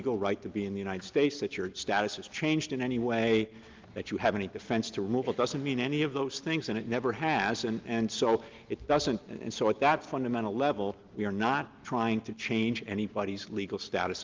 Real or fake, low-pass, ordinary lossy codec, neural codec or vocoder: real; 7.2 kHz; Opus, 32 kbps; none